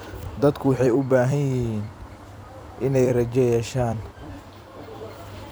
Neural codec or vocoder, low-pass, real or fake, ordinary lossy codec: vocoder, 44.1 kHz, 128 mel bands every 256 samples, BigVGAN v2; none; fake; none